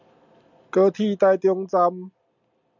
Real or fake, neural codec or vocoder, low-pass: real; none; 7.2 kHz